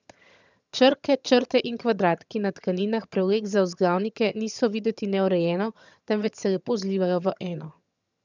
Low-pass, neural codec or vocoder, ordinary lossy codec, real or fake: 7.2 kHz; vocoder, 22.05 kHz, 80 mel bands, HiFi-GAN; none; fake